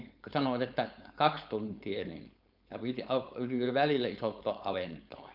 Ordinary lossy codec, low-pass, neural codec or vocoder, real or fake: none; 5.4 kHz; codec, 16 kHz, 4.8 kbps, FACodec; fake